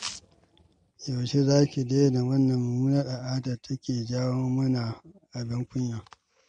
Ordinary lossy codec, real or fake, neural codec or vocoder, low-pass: MP3, 48 kbps; real; none; 9.9 kHz